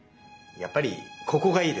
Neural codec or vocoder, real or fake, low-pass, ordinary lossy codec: none; real; none; none